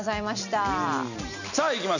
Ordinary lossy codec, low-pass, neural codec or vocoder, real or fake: none; 7.2 kHz; none; real